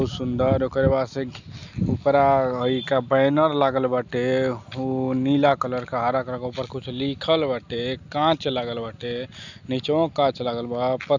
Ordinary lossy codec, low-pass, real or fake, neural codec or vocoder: none; 7.2 kHz; real; none